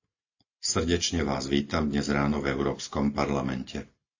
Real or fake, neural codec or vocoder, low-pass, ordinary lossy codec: real; none; 7.2 kHz; AAC, 48 kbps